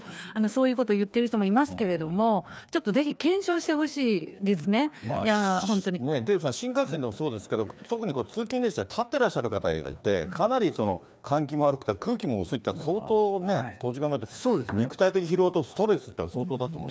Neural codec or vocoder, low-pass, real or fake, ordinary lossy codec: codec, 16 kHz, 2 kbps, FreqCodec, larger model; none; fake; none